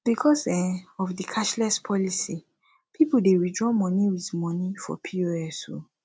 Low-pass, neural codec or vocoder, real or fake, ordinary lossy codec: none; none; real; none